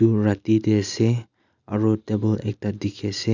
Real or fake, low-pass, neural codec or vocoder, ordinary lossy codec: real; 7.2 kHz; none; none